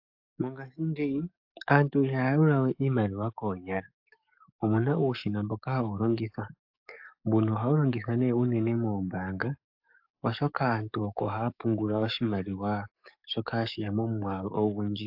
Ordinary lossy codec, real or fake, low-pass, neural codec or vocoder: AAC, 48 kbps; fake; 5.4 kHz; codec, 44.1 kHz, 7.8 kbps, DAC